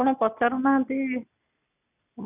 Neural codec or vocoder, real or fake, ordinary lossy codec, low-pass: none; real; none; 3.6 kHz